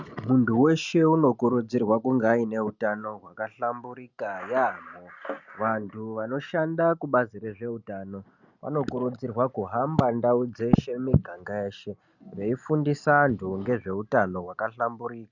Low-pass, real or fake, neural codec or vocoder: 7.2 kHz; real; none